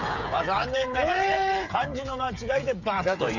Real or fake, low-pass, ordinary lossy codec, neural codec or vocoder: fake; 7.2 kHz; none; codec, 16 kHz, 8 kbps, FreqCodec, smaller model